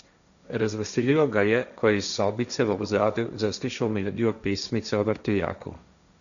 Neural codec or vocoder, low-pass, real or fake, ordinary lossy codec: codec, 16 kHz, 1.1 kbps, Voila-Tokenizer; 7.2 kHz; fake; none